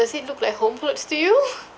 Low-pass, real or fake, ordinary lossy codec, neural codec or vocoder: none; real; none; none